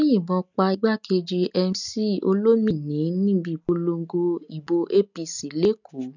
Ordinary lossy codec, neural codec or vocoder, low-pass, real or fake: none; none; 7.2 kHz; real